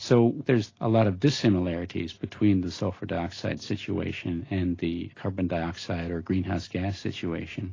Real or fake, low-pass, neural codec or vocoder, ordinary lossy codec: real; 7.2 kHz; none; AAC, 32 kbps